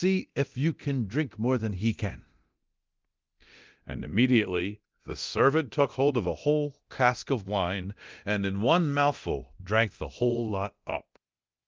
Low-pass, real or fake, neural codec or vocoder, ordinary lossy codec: 7.2 kHz; fake; codec, 24 kHz, 0.9 kbps, DualCodec; Opus, 24 kbps